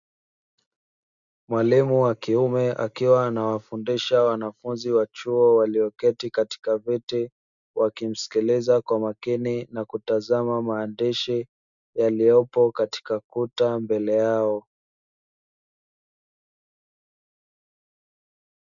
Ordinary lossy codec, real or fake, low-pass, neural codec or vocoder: MP3, 96 kbps; real; 7.2 kHz; none